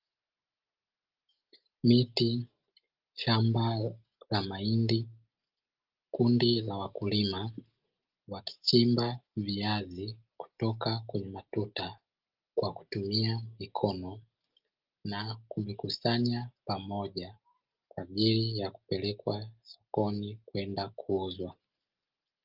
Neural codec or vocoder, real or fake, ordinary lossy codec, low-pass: none; real; Opus, 32 kbps; 5.4 kHz